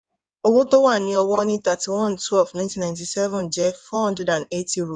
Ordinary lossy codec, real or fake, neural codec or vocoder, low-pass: none; fake; codec, 16 kHz in and 24 kHz out, 2.2 kbps, FireRedTTS-2 codec; 9.9 kHz